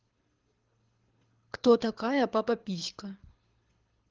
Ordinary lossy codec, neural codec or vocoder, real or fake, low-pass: Opus, 16 kbps; codec, 24 kHz, 6 kbps, HILCodec; fake; 7.2 kHz